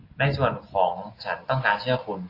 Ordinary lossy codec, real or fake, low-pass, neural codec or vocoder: AAC, 32 kbps; real; 5.4 kHz; none